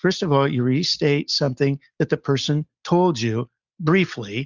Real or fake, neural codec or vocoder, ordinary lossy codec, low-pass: real; none; Opus, 64 kbps; 7.2 kHz